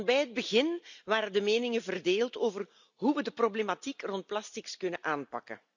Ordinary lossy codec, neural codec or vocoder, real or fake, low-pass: none; none; real; 7.2 kHz